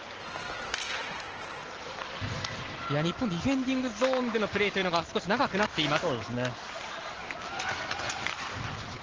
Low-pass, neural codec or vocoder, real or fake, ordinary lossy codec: 7.2 kHz; none; real; Opus, 16 kbps